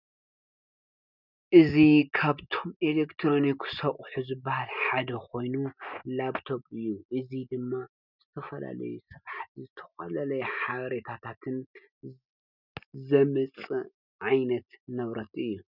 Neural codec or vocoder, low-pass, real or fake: none; 5.4 kHz; real